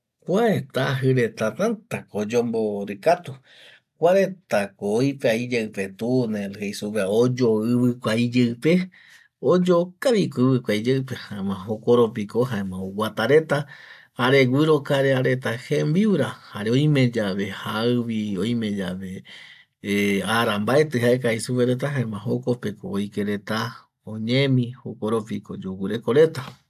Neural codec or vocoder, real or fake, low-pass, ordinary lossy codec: none; real; 14.4 kHz; AAC, 96 kbps